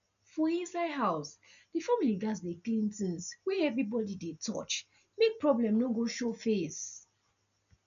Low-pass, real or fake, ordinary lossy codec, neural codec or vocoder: 7.2 kHz; real; none; none